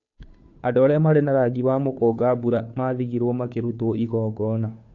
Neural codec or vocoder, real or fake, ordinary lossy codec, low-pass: codec, 16 kHz, 2 kbps, FunCodec, trained on Chinese and English, 25 frames a second; fake; AAC, 48 kbps; 7.2 kHz